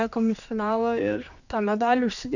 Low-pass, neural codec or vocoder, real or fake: 7.2 kHz; codec, 16 kHz, 2 kbps, X-Codec, HuBERT features, trained on general audio; fake